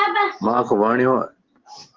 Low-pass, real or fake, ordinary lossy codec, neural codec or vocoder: 7.2 kHz; real; Opus, 16 kbps; none